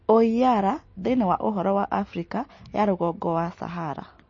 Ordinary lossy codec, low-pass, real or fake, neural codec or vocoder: MP3, 32 kbps; 7.2 kHz; real; none